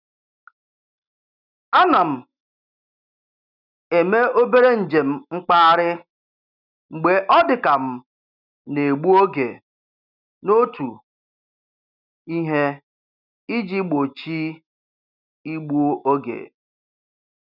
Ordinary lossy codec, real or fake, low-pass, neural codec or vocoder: none; real; 5.4 kHz; none